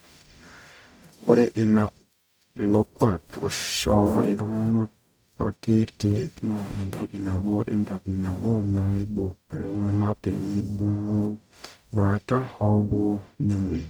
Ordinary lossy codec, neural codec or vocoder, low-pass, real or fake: none; codec, 44.1 kHz, 0.9 kbps, DAC; none; fake